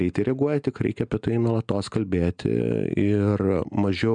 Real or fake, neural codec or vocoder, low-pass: real; none; 9.9 kHz